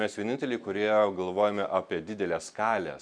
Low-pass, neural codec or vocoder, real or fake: 9.9 kHz; none; real